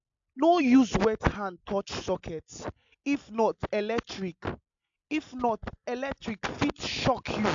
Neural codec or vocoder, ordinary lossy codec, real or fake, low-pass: none; AAC, 64 kbps; real; 7.2 kHz